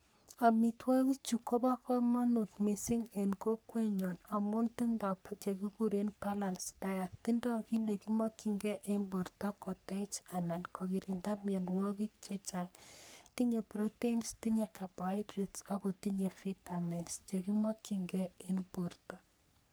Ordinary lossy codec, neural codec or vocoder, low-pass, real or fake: none; codec, 44.1 kHz, 3.4 kbps, Pupu-Codec; none; fake